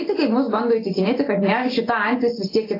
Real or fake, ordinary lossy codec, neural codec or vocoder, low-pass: real; AAC, 24 kbps; none; 5.4 kHz